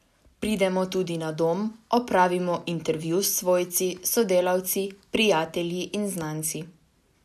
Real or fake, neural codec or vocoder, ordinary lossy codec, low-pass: real; none; none; 14.4 kHz